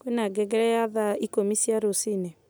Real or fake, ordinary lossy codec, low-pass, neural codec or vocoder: real; none; none; none